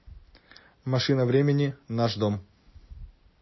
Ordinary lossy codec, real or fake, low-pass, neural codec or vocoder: MP3, 24 kbps; real; 7.2 kHz; none